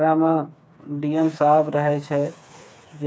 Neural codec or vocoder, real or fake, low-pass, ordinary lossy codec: codec, 16 kHz, 4 kbps, FreqCodec, smaller model; fake; none; none